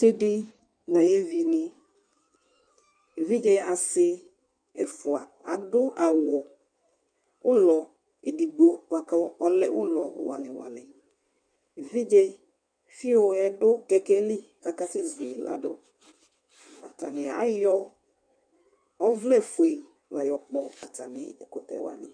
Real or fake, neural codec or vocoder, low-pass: fake; codec, 16 kHz in and 24 kHz out, 1.1 kbps, FireRedTTS-2 codec; 9.9 kHz